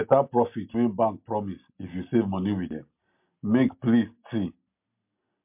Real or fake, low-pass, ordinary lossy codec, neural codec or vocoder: real; 3.6 kHz; MP3, 32 kbps; none